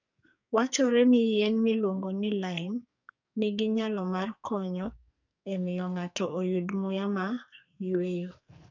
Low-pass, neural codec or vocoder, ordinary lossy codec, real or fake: 7.2 kHz; codec, 44.1 kHz, 2.6 kbps, SNAC; none; fake